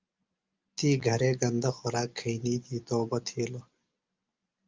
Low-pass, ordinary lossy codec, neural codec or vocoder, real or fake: 7.2 kHz; Opus, 24 kbps; none; real